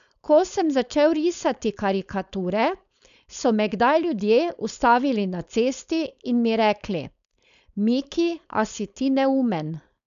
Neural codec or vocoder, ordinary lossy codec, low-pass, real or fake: codec, 16 kHz, 4.8 kbps, FACodec; none; 7.2 kHz; fake